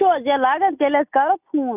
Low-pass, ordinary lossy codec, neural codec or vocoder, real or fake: 3.6 kHz; none; none; real